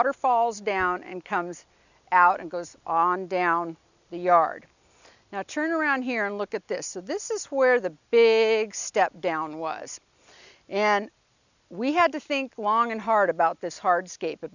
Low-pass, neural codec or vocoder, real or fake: 7.2 kHz; none; real